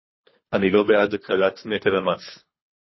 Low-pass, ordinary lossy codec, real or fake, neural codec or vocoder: 7.2 kHz; MP3, 24 kbps; fake; codec, 24 kHz, 3 kbps, HILCodec